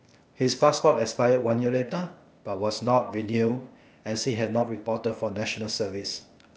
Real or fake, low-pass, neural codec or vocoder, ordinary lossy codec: fake; none; codec, 16 kHz, 0.8 kbps, ZipCodec; none